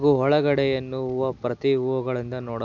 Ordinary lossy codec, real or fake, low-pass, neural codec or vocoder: none; real; 7.2 kHz; none